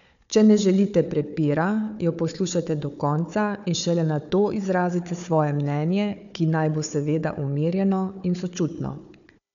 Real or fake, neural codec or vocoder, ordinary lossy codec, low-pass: fake; codec, 16 kHz, 4 kbps, FunCodec, trained on Chinese and English, 50 frames a second; none; 7.2 kHz